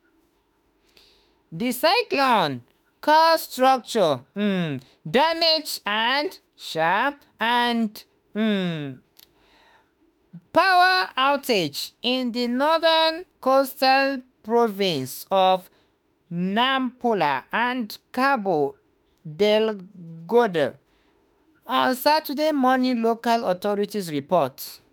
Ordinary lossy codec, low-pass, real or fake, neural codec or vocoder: none; none; fake; autoencoder, 48 kHz, 32 numbers a frame, DAC-VAE, trained on Japanese speech